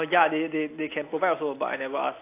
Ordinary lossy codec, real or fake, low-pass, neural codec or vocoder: AAC, 24 kbps; real; 3.6 kHz; none